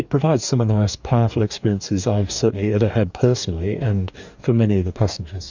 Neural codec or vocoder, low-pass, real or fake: codec, 44.1 kHz, 2.6 kbps, DAC; 7.2 kHz; fake